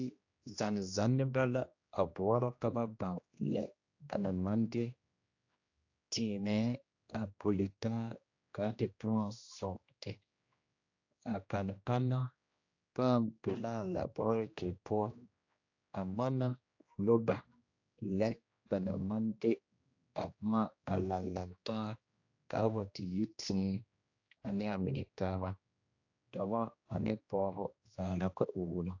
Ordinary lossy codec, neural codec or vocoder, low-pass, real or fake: AAC, 48 kbps; codec, 16 kHz, 1 kbps, X-Codec, HuBERT features, trained on general audio; 7.2 kHz; fake